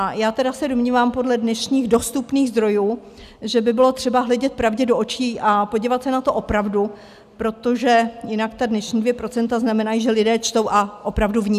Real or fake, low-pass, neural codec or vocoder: real; 14.4 kHz; none